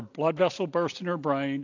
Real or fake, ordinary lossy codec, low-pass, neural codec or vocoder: real; AAC, 48 kbps; 7.2 kHz; none